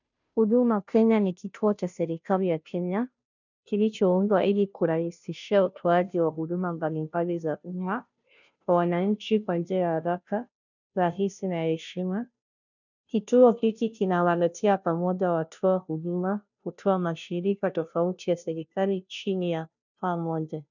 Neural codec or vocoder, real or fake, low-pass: codec, 16 kHz, 0.5 kbps, FunCodec, trained on Chinese and English, 25 frames a second; fake; 7.2 kHz